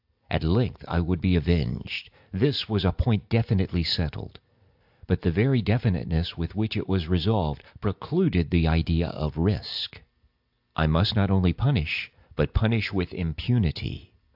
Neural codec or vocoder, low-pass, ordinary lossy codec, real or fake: none; 5.4 kHz; AAC, 48 kbps; real